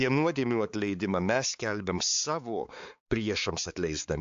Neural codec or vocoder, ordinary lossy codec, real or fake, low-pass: codec, 16 kHz, 4 kbps, X-Codec, HuBERT features, trained on balanced general audio; AAC, 64 kbps; fake; 7.2 kHz